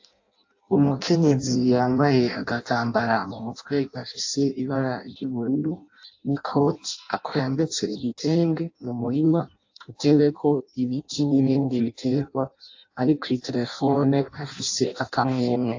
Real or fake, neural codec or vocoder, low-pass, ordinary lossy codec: fake; codec, 16 kHz in and 24 kHz out, 0.6 kbps, FireRedTTS-2 codec; 7.2 kHz; AAC, 48 kbps